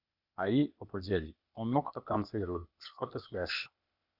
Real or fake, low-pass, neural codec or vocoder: fake; 5.4 kHz; codec, 16 kHz, 0.8 kbps, ZipCodec